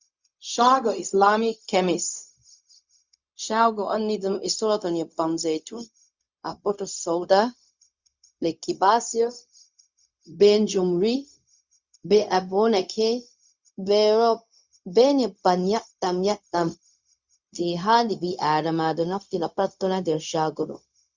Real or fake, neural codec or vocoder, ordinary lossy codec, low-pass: fake; codec, 16 kHz, 0.4 kbps, LongCat-Audio-Codec; Opus, 64 kbps; 7.2 kHz